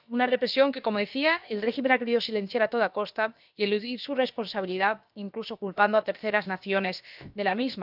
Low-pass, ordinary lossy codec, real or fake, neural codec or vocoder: 5.4 kHz; none; fake; codec, 16 kHz, about 1 kbps, DyCAST, with the encoder's durations